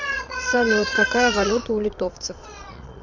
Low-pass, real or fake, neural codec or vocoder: 7.2 kHz; fake; vocoder, 22.05 kHz, 80 mel bands, Vocos